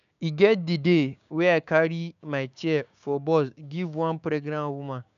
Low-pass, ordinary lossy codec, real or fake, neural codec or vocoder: 7.2 kHz; none; fake; codec, 16 kHz, 6 kbps, DAC